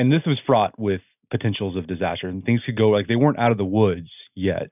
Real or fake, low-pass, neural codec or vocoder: real; 3.6 kHz; none